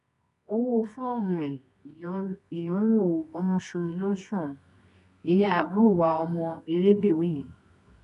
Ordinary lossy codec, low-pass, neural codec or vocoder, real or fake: none; 10.8 kHz; codec, 24 kHz, 0.9 kbps, WavTokenizer, medium music audio release; fake